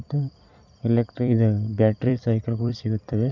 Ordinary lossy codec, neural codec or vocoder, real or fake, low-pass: none; vocoder, 44.1 kHz, 128 mel bands every 256 samples, BigVGAN v2; fake; 7.2 kHz